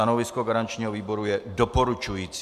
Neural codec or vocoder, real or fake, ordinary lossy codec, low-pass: none; real; MP3, 96 kbps; 14.4 kHz